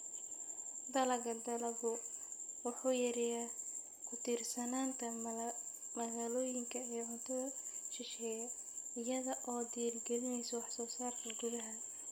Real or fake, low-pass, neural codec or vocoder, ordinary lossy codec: real; none; none; none